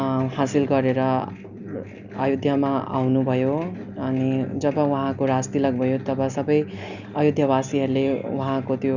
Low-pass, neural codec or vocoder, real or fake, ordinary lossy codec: 7.2 kHz; none; real; none